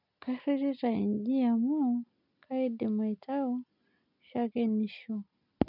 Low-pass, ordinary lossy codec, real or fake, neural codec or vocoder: 5.4 kHz; none; real; none